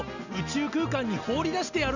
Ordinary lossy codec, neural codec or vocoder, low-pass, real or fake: none; none; 7.2 kHz; real